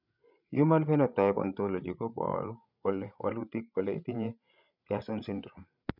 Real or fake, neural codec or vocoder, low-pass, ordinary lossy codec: fake; codec, 16 kHz, 8 kbps, FreqCodec, larger model; 5.4 kHz; none